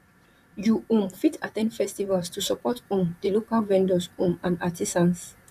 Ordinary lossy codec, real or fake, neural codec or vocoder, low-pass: none; fake; vocoder, 44.1 kHz, 128 mel bands, Pupu-Vocoder; 14.4 kHz